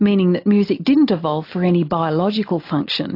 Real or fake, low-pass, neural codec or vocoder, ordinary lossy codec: real; 5.4 kHz; none; AAC, 32 kbps